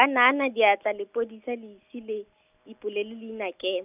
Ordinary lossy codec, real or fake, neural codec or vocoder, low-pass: none; real; none; 3.6 kHz